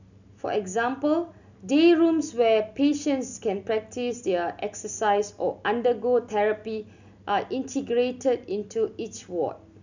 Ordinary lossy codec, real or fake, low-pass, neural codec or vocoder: none; real; 7.2 kHz; none